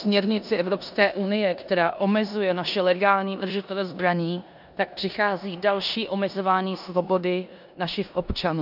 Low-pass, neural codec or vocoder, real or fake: 5.4 kHz; codec, 16 kHz in and 24 kHz out, 0.9 kbps, LongCat-Audio-Codec, four codebook decoder; fake